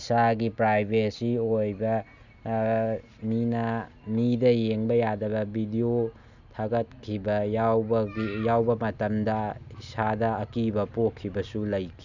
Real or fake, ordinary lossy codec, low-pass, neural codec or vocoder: real; none; 7.2 kHz; none